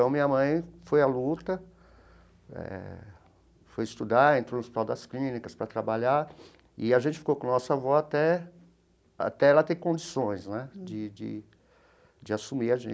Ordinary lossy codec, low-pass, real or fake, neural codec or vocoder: none; none; real; none